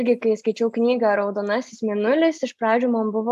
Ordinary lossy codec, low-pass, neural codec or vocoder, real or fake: AAC, 96 kbps; 14.4 kHz; none; real